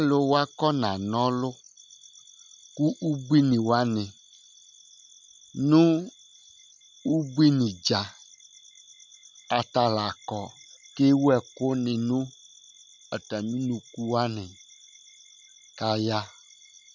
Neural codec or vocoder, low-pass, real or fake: none; 7.2 kHz; real